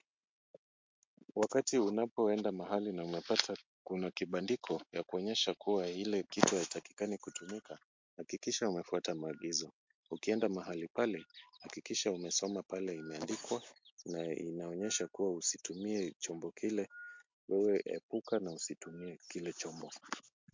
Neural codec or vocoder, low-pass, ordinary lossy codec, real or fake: none; 7.2 kHz; MP3, 48 kbps; real